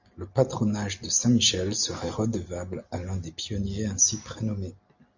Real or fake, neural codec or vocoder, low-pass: real; none; 7.2 kHz